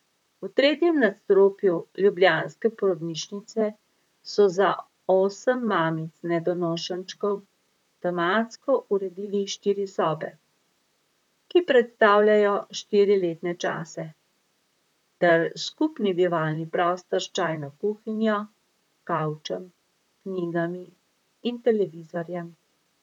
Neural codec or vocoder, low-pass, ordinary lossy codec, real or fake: vocoder, 44.1 kHz, 128 mel bands, Pupu-Vocoder; 19.8 kHz; none; fake